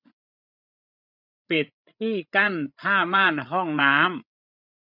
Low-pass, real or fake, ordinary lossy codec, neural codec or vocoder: 5.4 kHz; fake; none; codec, 16 kHz, 8 kbps, FreqCodec, larger model